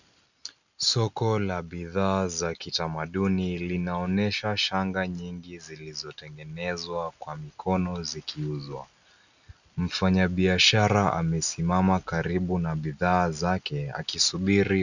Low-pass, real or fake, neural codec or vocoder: 7.2 kHz; real; none